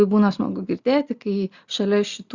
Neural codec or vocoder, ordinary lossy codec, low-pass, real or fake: none; Opus, 64 kbps; 7.2 kHz; real